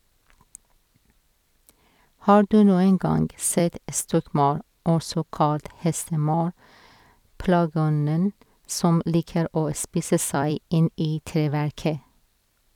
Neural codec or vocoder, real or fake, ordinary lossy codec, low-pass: vocoder, 44.1 kHz, 128 mel bands every 256 samples, BigVGAN v2; fake; none; 19.8 kHz